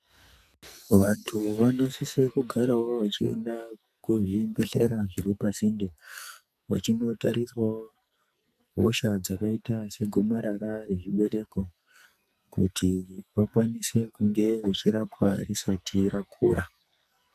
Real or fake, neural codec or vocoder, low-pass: fake; codec, 44.1 kHz, 2.6 kbps, SNAC; 14.4 kHz